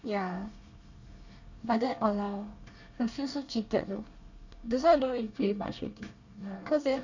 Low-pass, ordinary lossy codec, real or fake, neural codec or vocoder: 7.2 kHz; none; fake; codec, 24 kHz, 1 kbps, SNAC